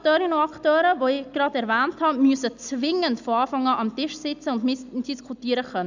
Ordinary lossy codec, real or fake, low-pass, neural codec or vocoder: none; real; 7.2 kHz; none